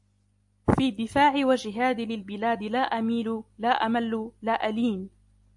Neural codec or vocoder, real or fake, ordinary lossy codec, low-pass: none; real; AAC, 64 kbps; 10.8 kHz